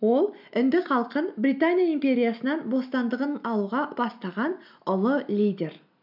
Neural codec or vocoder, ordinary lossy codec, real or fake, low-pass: none; none; real; 5.4 kHz